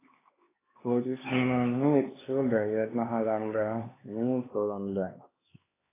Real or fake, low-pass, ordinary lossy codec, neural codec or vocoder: fake; 3.6 kHz; AAC, 16 kbps; codec, 16 kHz, 2 kbps, X-Codec, HuBERT features, trained on LibriSpeech